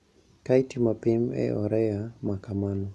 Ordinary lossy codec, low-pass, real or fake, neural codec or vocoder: none; none; real; none